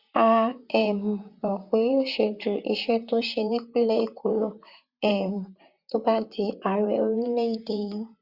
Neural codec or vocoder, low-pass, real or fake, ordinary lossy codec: vocoder, 44.1 kHz, 128 mel bands, Pupu-Vocoder; 5.4 kHz; fake; Opus, 64 kbps